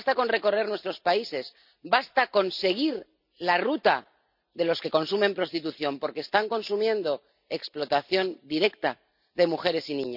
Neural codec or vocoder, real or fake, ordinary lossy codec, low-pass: none; real; none; 5.4 kHz